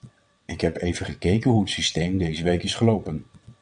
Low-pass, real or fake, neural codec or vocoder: 9.9 kHz; fake; vocoder, 22.05 kHz, 80 mel bands, WaveNeXt